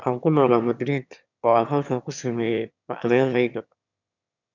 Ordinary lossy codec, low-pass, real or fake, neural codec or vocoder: none; 7.2 kHz; fake; autoencoder, 22.05 kHz, a latent of 192 numbers a frame, VITS, trained on one speaker